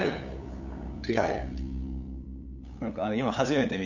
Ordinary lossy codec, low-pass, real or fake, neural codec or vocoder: none; 7.2 kHz; fake; codec, 16 kHz, 8 kbps, FunCodec, trained on LibriTTS, 25 frames a second